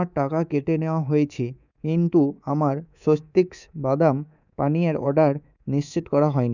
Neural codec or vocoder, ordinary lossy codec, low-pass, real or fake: codec, 24 kHz, 3.1 kbps, DualCodec; none; 7.2 kHz; fake